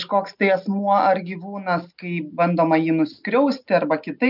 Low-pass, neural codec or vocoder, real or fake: 5.4 kHz; none; real